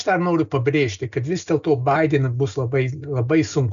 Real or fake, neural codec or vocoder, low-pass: real; none; 7.2 kHz